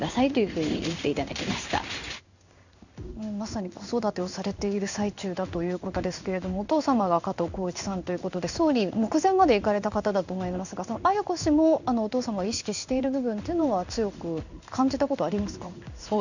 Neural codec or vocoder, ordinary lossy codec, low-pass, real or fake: codec, 16 kHz in and 24 kHz out, 1 kbps, XY-Tokenizer; none; 7.2 kHz; fake